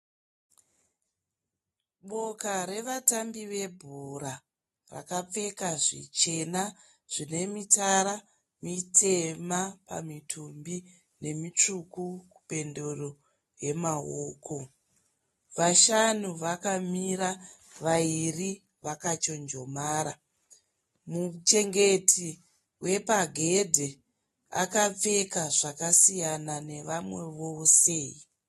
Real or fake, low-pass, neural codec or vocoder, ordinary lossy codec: real; 19.8 kHz; none; AAC, 32 kbps